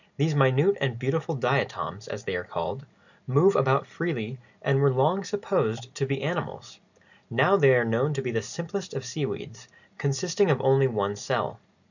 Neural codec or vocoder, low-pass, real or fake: none; 7.2 kHz; real